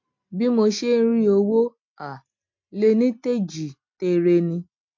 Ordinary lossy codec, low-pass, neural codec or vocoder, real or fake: MP3, 48 kbps; 7.2 kHz; none; real